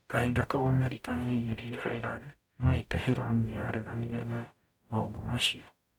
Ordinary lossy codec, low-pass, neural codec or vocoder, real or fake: none; 19.8 kHz; codec, 44.1 kHz, 0.9 kbps, DAC; fake